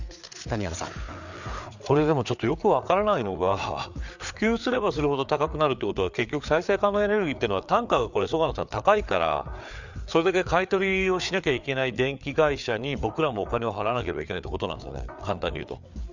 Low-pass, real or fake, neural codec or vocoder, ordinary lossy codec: 7.2 kHz; fake; codec, 16 kHz, 4 kbps, FreqCodec, larger model; none